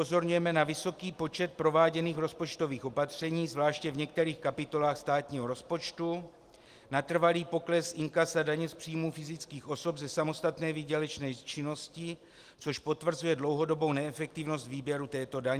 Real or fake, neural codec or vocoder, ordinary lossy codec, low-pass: real; none; Opus, 24 kbps; 14.4 kHz